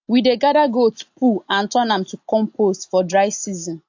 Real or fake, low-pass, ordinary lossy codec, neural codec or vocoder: real; 7.2 kHz; AAC, 48 kbps; none